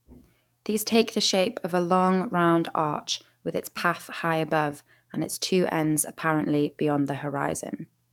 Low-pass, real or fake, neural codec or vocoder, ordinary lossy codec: 19.8 kHz; fake; codec, 44.1 kHz, 7.8 kbps, DAC; none